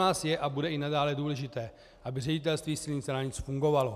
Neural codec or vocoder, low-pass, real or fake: none; 14.4 kHz; real